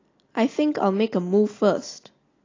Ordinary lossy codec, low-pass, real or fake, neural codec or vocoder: AAC, 32 kbps; 7.2 kHz; real; none